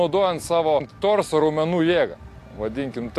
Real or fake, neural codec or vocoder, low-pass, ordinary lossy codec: real; none; 14.4 kHz; AAC, 64 kbps